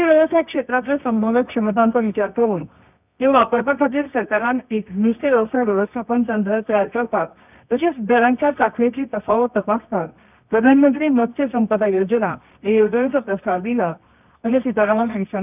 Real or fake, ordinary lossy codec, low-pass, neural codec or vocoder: fake; none; 3.6 kHz; codec, 24 kHz, 0.9 kbps, WavTokenizer, medium music audio release